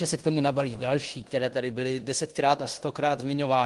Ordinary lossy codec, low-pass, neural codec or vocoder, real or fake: Opus, 24 kbps; 10.8 kHz; codec, 16 kHz in and 24 kHz out, 0.9 kbps, LongCat-Audio-Codec, fine tuned four codebook decoder; fake